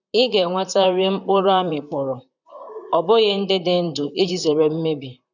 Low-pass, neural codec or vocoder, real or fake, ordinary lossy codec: 7.2 kHz; vocoder, 44.1 kHz, 128 mel bands, Pupu-Vocoder; fake; none